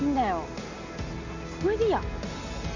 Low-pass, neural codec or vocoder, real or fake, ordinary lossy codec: 7.2 kHz; none; real; Opus, 64 kbps